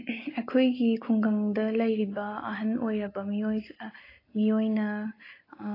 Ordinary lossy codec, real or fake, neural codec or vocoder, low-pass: AAC, 24 kbps; real; none; 5.4 kHz